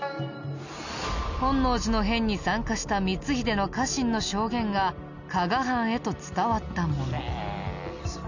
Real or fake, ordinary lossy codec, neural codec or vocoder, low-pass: real; none; none; 7.2 kHz